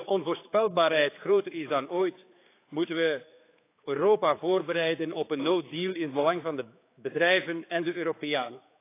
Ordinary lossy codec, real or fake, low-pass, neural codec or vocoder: AAC, 24 kbps; fake; 3.6 kHz; codec, 16 kHz, 4 kbps, X-Codec, HuBERT features, trained on general audio